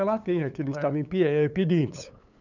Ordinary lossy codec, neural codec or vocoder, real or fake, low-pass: none; codec, 16 kHz, 8 kbps, FunCodec, trained on LibriTTS, 25 frames a second; fake; 7.2 kHz